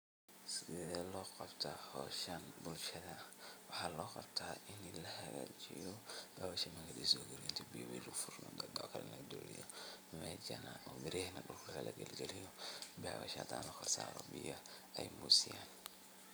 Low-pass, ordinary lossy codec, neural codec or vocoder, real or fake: none; none; none; real